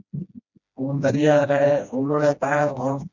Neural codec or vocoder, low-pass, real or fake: codec, 16 kHz, 1 kbps, FreqCodec, smaller model; 7.2 kHz; fake